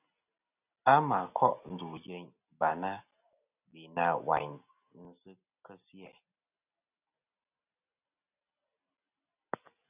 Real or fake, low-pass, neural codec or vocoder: real; 3.6 kHz; none